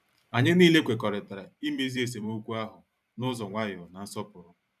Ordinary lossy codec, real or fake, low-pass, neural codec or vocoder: none; fake; 14.4 kHz; vocoder, 44.1 kHz, 128 mel bands every 512 samples, BigVGAN v2